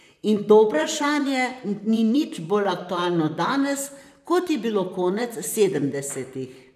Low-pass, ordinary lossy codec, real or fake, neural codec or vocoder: 14.4 kHz; none; fake; vocoder, 44.1 kHz, 128 mel bands, Pupu-Vocoder